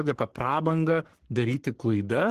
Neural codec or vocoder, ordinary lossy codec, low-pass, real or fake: codec, 44.1 kHz, 3.4 kbps, Pupu-Codec; Opus, 16 kbps; 14.4 kHz; fake